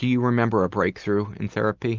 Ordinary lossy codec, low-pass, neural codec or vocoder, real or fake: Opus, 24 kbps; 7.2 kHz; none; real